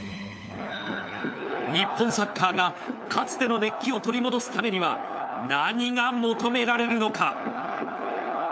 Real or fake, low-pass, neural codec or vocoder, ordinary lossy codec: fake; none; codec, 16 kHz, 4 kbps, FunCodec, trained on LibriTTS, 50 frames a second; none